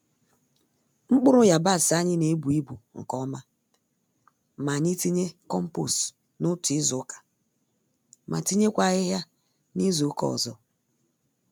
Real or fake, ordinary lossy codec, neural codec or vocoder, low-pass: real; none; none; none